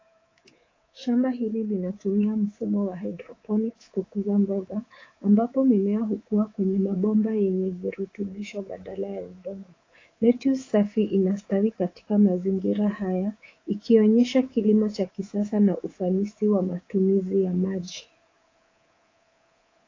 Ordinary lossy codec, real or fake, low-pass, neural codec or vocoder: AAC, 32 kbps; fake; 7.2 kHz; codec, 24 kHz, 3.1 kbps, DualCodec